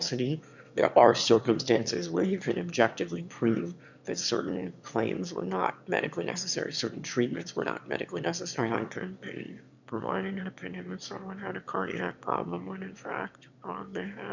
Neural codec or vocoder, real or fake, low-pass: autoencoder, 22.05 kHz, a latent of 192 numbers a frame, VITS, trained on one speaker; fake; 7.2 kHz